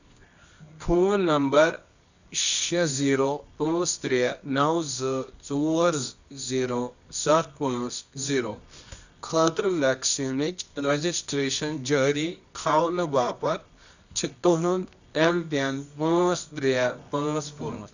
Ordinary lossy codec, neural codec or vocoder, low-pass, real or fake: none; codec, 24 kHz, 0.9 kbps, WavTokenizer, medium music audio release; 7.2 kHz; fake